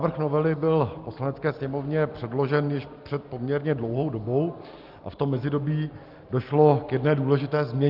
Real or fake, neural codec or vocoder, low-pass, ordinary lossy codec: real; none; 5.4 kHz; Opus, 24 kbps